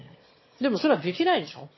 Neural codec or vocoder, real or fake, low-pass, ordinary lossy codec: autoencoder, 22.05 kHz, a latent of 192 numbers a frame, VITS, trained on one speaker; fake; 7.2 kHz; MP3, 24 kbps